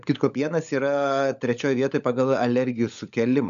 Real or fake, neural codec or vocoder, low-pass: fake; codec, 16 kHz, 16 kbps, FunCodec, trained on LibriTTS, 50 frames a second; 7.2 kHz